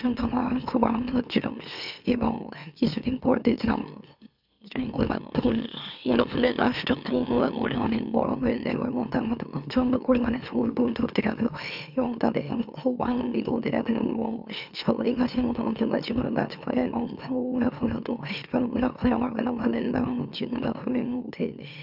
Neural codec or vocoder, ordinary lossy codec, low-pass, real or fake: autoencoder, 44.1 kHz, a latent of 192 numbers a frame, MeloTTS; none; 5.4 kHz; fake